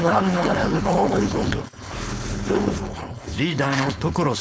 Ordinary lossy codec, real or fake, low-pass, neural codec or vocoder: none; fake; none; codec, 16 kHz, 4.8 kbps, FACodec